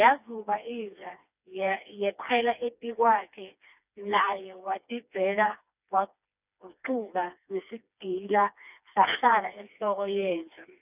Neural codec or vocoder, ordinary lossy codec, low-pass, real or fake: codec, 16 kHz, 2 kbps, FreqCodec, smaller model; none; 3.6 kHz; fake